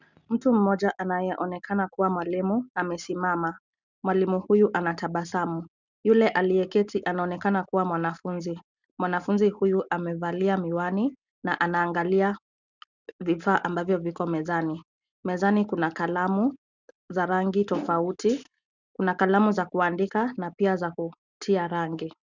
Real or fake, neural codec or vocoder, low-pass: real; none; 7.2 kHz